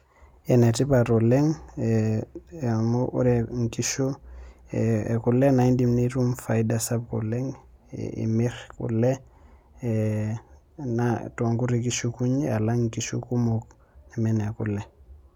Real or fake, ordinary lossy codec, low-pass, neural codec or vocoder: real; none; 19.8 kHz; none